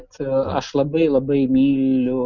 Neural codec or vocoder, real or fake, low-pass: none; real; 7.2 kHz